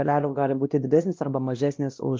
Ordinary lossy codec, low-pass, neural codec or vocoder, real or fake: Opus, 32 kbps; 7.2 kHz; codec, 16 kHz, 1 kbps, X-Codec, WavLM features, trained on Multilingual LibriSpeech; fake